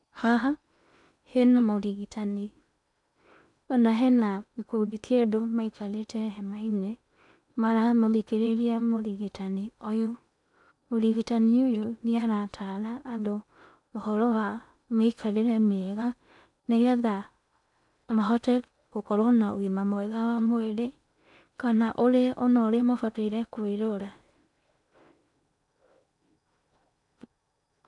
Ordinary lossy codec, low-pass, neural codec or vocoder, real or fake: none; 10.8 kHz; codec, 16 kHz in and 24 kHz out, 0.8 kbps, FocalCodec, streaming, 65536 codes; fake